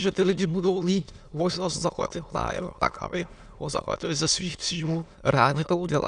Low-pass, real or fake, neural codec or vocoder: 9.9 kHz; fake; autoencoder, 22.05 kHz, a latent of 192 numbers a frame, VITS, trained on many speakers